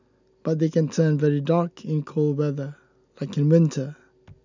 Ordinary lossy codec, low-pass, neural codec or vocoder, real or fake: none; 7.2 kHz; none; real